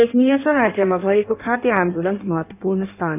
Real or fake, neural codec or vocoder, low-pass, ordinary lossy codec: fake; codec, 16 kHz in and 24 kHz out, 1.1 kbps, FireRedTTS-2 codec; 3.6 kHz; none